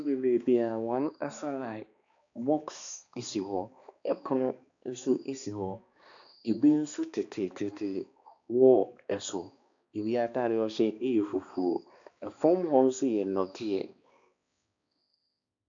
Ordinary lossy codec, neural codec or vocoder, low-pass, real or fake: AAC, 64 kbps; codec, 16 kHz, 2 kbps, X-Codec, HuBERT features, trained on balanced general audio; 7.2 kHz; fake